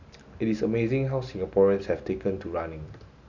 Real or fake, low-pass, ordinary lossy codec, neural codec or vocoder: real; 7.2 kHz; none; none